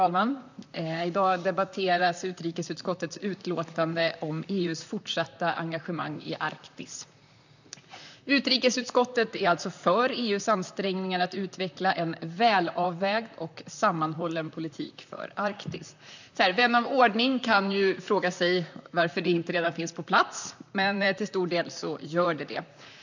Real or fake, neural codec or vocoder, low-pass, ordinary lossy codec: fake; vocoder, 44.1 kHz, 128 mel bands, Pupu-Vocoder; 7.2 kHz; none